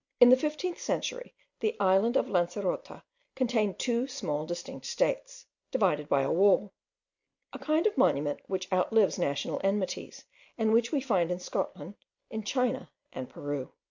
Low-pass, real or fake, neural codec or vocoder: 7.2 kHz; real; none